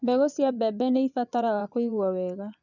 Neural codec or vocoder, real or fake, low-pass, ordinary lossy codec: vocoder, 24 kHz, 100 mel bands, Vocos; fake; 7.2 kHz; none